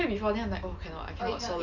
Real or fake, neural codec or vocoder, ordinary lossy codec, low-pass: real; none; Opus, 64 kbps; 7.2 kHz